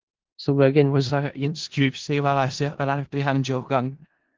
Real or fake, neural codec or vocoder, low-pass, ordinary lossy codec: fake; codec, 16 kHz in and 24 kHz out, 0.4 kbps, LongCat-Audio-Codec, four codebook decoder; 7.2 kHz; Opus, 16 kbps